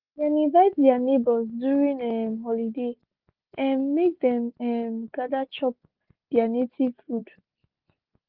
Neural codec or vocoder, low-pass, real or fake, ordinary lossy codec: none; 5.4 kHz; real; Opus, 32 kbps